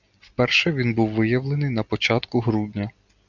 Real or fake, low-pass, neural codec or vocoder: real; 7.2 kHz; none